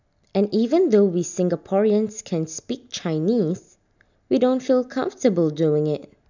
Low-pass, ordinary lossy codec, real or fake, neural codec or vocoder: 7.2 kHz; none; real; none